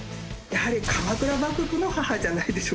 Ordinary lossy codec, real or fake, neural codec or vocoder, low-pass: none; real; none; none